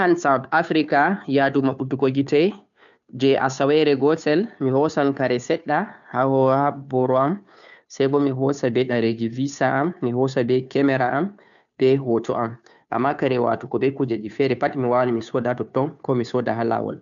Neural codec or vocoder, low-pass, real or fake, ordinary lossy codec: codec, 16 kHz, 2 kbps, FunCodec, trained on Chinese and English, 25 frames a second; 7.2 kHz; fake; none